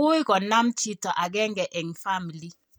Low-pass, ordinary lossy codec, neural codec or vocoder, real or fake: none; none; none; real